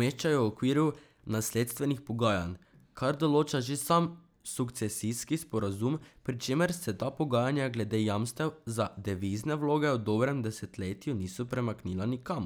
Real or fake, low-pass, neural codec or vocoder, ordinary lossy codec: real; none; none; none